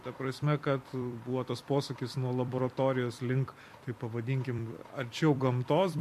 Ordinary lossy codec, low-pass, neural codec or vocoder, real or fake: MP3, 64 kbps; 14.4 kHz; vocoder, 44.1 kHz, 128 mel bands every 256 samples, BigVGAN v2; fake